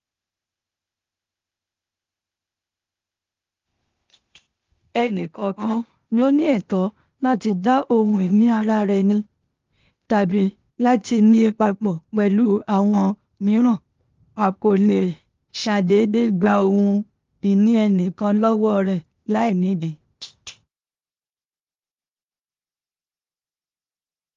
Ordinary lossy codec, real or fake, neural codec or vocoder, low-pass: Opus, 32 kbps; fake; codec, 16 kHz, 0.8 kbps, ZipCodec; 7.2 kHz